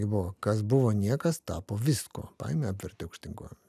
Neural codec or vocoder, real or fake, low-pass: none; real; 14.4 kHz